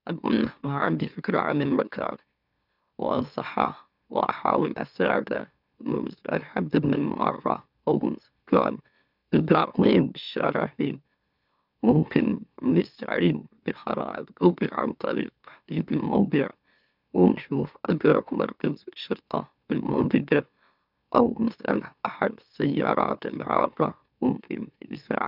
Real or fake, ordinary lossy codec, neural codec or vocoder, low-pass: fake; none; autoencoder, 44.1 kHz, a latent of 192 numbers a frame, MeloTTS; 5.4 kHz